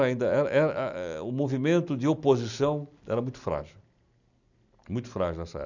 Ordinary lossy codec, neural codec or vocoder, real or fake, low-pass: none; none; real; 7.2 kHz